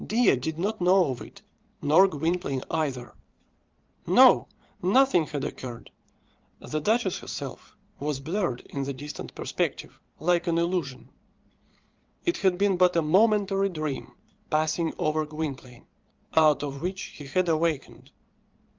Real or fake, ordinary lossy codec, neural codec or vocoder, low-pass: fake; Opus, 24 kbps; vocoder, 22.05 kHz, 80 mel bands, Vocos; 7.2 kHz